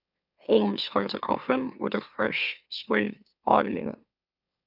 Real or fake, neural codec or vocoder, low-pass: fake; autoencoder, 44.1 kHz, a latent of 192 numbers a frame, MeloTTS; 5.4 kHz